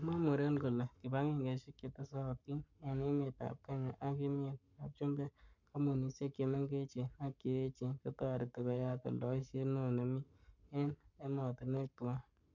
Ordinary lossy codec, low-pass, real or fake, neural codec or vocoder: none; 7.2 kHz; fake; codec, 44.1 kHz, 7.8 kbps, Pupu-Codec